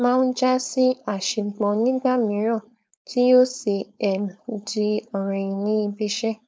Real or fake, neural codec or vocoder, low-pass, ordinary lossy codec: fake; codec, 16 kHz, 4.8 kbps, FACodec; none; none